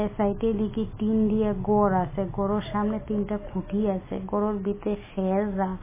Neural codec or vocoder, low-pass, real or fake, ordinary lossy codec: none; 3.6 kHz; real; MP3, 16 kbps